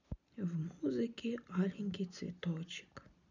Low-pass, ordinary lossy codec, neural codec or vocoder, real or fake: 7.2 kHz; none; none; real